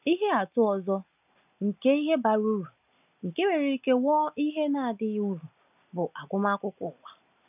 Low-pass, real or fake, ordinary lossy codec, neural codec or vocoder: 3.6 kHz; real; none; none